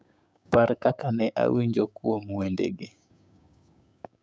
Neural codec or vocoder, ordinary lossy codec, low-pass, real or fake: codec, 16 kHz, 6 kbps, DAC; none; none; fake